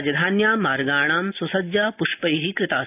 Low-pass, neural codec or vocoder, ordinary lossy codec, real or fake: 3.6 kHz; none; AAC, 32 kbps; real